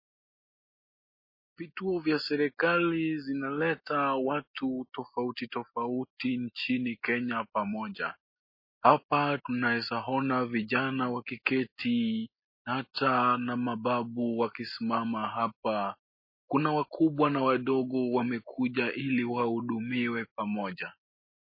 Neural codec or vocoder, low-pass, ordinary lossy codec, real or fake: none; 5.4 kHz; MP3, 24 kbps; real